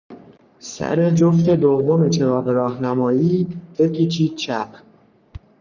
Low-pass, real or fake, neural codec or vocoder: 7.2 kHz; fake; codec, 44.1 kHz, 3.4 kbps, Pupu-Codec